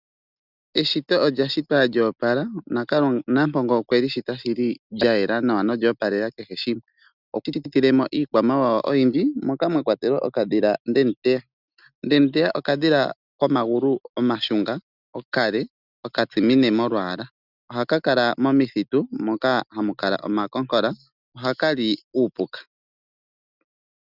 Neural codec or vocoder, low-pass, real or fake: none; 5.4 kHz; real